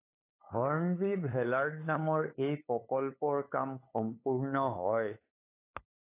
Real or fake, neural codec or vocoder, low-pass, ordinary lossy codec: fake; codec, 16 kHz, 8 kbps, FunCodec, trained on LibriTTS, 25 frames a second; 3.6 kHz; AAC, 24 kbps